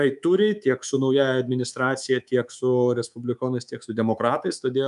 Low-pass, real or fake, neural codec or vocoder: 10.8 kHz; fake; codec, 24 kHz, 3.1 kbps, DualCodec